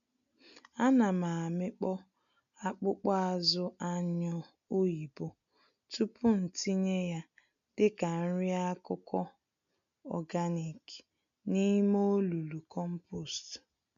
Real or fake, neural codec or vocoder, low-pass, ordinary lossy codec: real; none; 7.2 kHz; MP3, 96 kbps